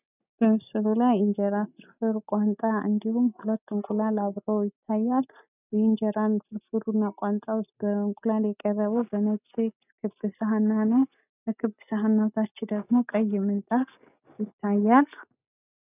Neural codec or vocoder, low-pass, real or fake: none; 3.6 kHz; real